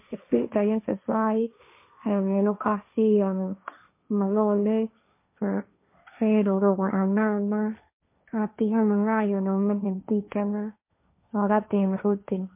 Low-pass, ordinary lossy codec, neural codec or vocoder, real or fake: 3.6 kHz; MP3, 24 kbps; codec, 16 kHz, 1.1 kbps, Voila-Tokenizer; fake